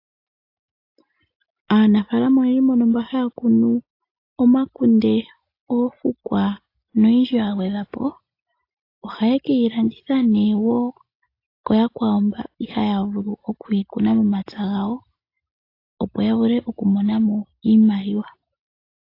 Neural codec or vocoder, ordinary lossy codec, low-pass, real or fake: none; AAC, 32 kbps; 5.4 kHz; real